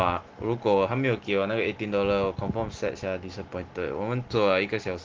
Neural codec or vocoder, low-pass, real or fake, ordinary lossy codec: none; 7.2 kHz; real; Opus, 16 kbps